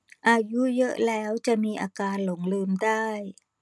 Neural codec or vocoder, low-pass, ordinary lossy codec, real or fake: none; none; none; real